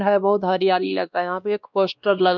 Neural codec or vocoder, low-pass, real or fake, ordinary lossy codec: codec, 16 kHz, 1 kbps, X-Codec, WavLM features, trained on Multilingual LibriSpeech; 7.2 kHz; fake; none